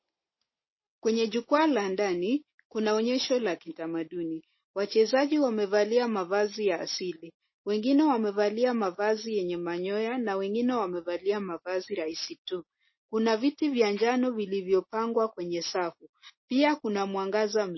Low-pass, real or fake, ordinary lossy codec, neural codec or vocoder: 7.2 kHz; real; MP3, 24 kbps; none